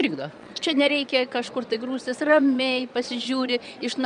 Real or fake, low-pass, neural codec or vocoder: fake; 9.9 kHz; vocoder, 22.05 kHz, 80 mel bands, Vocos